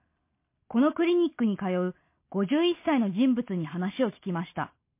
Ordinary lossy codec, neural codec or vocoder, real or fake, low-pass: MP3, 24 kbps; none; real; 3.6 kHz